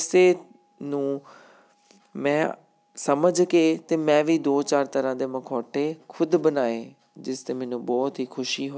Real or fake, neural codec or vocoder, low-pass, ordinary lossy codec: real; none; none; none